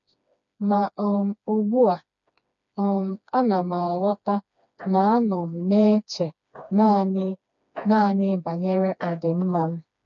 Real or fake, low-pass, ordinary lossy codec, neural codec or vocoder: fake; 7.2 kHz; MP3, 96 kbps; codec, 16 kHz, 2 kbps, FreqCodec, smaller model